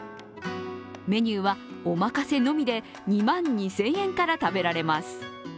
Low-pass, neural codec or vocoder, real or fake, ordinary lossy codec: none; none; real; none